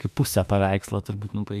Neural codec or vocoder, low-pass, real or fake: autoencoder, 48 kHz, 32 numbers a frame, DAC-VAE, trained on Japanese speech; 14.4 kHz; fake